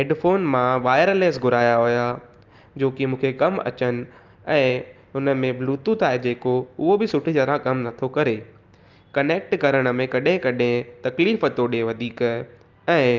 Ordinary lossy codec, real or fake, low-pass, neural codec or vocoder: Opus, 24 kbps; real; 7.2 kHz; none